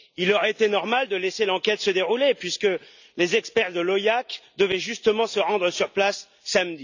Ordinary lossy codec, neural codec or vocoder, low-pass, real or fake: none; none; 7.2 kHz; real